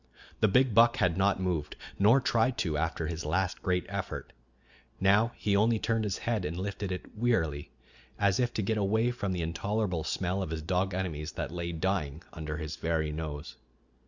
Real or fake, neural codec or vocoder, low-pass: real; none; 7.2 kHz